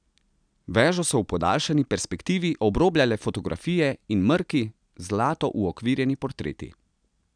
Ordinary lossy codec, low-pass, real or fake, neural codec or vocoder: none; 9.9 kHz; real; none